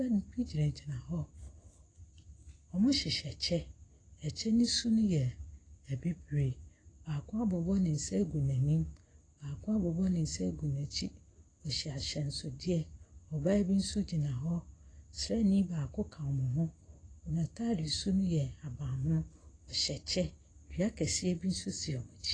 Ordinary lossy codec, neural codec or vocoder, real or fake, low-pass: AAC, 32 kbps; none; real; 9.9 kHz